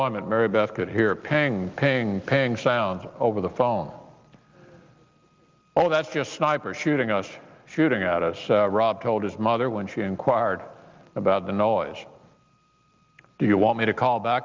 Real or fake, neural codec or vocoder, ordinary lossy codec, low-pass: real; none; Opus, 32 kbps; 7.2 kHz